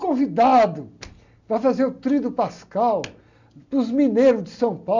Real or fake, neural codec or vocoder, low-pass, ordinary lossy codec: real; none; 7.2 kHz; none